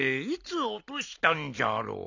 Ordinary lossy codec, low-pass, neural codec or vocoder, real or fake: none; 7.2 kHz; none; real